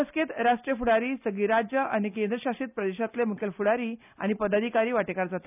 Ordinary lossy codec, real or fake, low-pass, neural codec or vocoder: none; real; 3.6 kHz; none